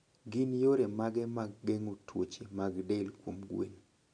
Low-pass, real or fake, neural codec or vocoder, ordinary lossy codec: 9.9 kHz; real; none; none